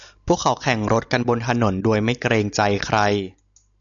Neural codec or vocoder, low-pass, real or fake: none; 7.2 kHz; real